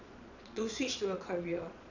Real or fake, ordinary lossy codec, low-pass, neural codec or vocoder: fake; none; 7.2 kHz; vocoder, 22.05 kHz, 80 mel bands, Vocos